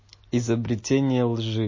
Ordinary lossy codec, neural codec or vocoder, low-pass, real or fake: MP3, 32 kbps; none; 7.2 kHz; real